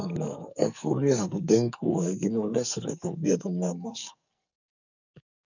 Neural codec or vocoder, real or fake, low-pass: codec, 44.1 kHz, 2.6 kbps, SNAC; fake; 7.2 kHz